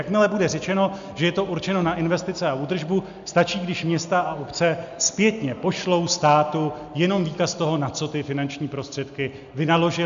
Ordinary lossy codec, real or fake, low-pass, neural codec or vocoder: MP3, 64 kbps; real; 7.2 kHz; none